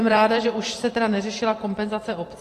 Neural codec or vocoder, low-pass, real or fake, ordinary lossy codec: vocoder, 44.1 kHz, 128 mel bands every 512 samples, BigVGAN v2; 14.4 kHz; fake; AAC, 48 kbps